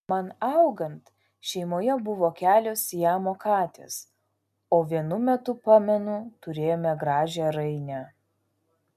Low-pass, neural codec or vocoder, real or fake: 14.4 kHz; none; real